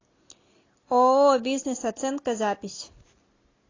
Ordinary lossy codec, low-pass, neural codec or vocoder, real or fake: AAC, 32 kbps; 7.2 kHz; none; real